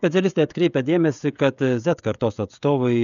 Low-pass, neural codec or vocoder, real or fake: 7.2 kHz; codec, 16 kHz, 16 kbps, FreqCodec, smaller model; fake